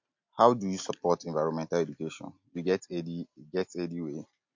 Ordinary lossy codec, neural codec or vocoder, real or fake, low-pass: MP3, 48 kbps; none; real; 7.2 kHz